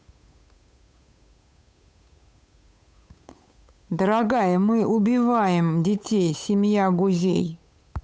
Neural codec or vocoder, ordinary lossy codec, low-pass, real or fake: codec, 16 kHz, 8 kbps, FunCodec, trained on Chinese and English, 25 frames a second; none; none; fake